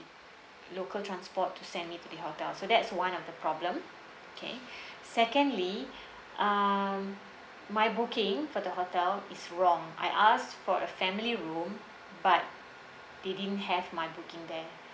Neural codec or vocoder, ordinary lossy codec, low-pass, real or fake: none; none; none; real